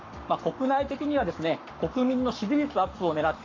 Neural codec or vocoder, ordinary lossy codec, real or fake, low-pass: codec, 44.1 kHz, 7.8 kbps, Pupu-Codec; MP3, 64 kbps; fake; 7.2 kHz